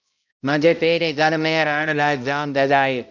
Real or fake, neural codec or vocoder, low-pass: fake; codec, 16 kHz, 0.5 kbps, X-Codec, HuBERT features, trained on balanced general audio; 7.2 kHz